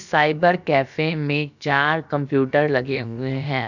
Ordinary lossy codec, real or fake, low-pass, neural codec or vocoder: none; fake; 7.2 kHz; codec, 16 kHz, about 1 kbps, DyCAST, with the encoder's durations